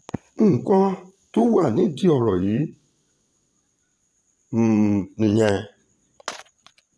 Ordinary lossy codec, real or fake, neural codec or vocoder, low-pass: none; fake; vocoder, 22.05 kHz, 80 mel bands, Vocos; none